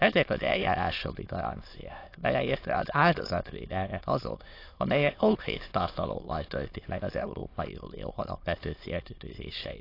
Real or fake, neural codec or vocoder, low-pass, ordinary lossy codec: fake; autoencoder, 22.05 kHz, a latent of 192 numbers a frame, VITS, trained on many speakers; 5.4 kHz; AAC, 32 kbps